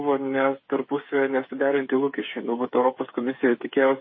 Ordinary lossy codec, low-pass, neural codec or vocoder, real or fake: MP3, 24 kbps; 7.2 kHz; codec, 16 kHz, 8 kbps, FreqCodec, smaller model; fake